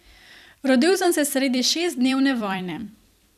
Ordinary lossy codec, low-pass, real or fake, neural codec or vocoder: none; 14.4 kHz; fake; vocoder, 44.1 kHz, 128 mel bands every 256 samples, BigVGAN v2